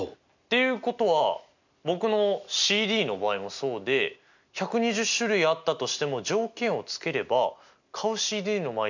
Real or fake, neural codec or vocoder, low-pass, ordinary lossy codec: real; none; 7.2 kHz; none